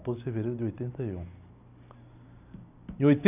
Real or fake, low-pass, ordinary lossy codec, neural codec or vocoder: real; 3.6 kHz; none; none